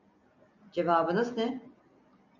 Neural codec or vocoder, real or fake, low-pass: none; real; 7.2 kHz